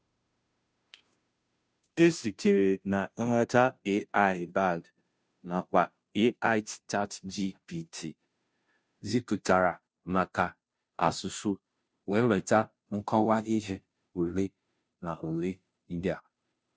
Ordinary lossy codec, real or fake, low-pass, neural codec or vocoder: none; fake; none; codec, 16 kHz, 0.5 kbps, FunCodec, trained on Chinese and English, 25 frames a second